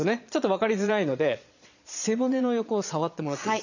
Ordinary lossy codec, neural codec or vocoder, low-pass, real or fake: none; vocoder, 22.05 kHz, 80 mel bands, Vocos; 7.2 kHz; fake